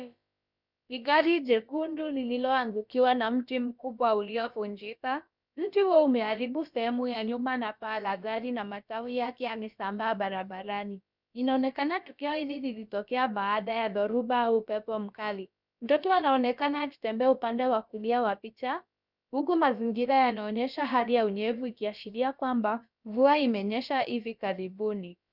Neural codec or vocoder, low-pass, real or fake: codec, 16 kHz, about 1 kbps, DyCAST, with the encoder's durations; 5.4 kHz; fake